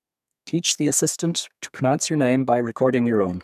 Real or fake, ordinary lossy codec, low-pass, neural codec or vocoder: fake; none; 14.4 kHz; codec, 32 kHz, 1.9 kbps, SNAC